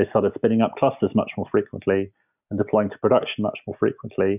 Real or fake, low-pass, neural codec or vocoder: real; 3.6 kHz; none